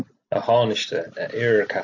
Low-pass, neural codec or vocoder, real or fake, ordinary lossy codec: 7.2 kHz; none; real; AAC, 48 kbps